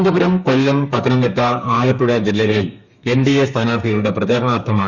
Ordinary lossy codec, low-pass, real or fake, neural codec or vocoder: none; 7.2 kHz; fake; codec, 32 kHz, 1.9 kbps, SNAC